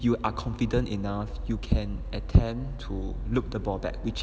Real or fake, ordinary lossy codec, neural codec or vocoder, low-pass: real; none; none; none